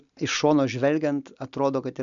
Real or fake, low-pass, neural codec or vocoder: real; 7.2 kHz; none